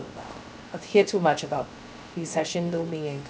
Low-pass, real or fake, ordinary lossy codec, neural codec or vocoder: none; fake; none; codec, 16 kHz, 0.3 kbps, FocalCodec